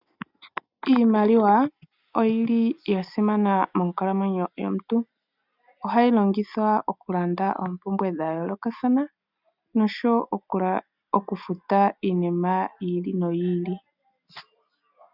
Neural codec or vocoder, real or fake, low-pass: none; real; 5.4 kHz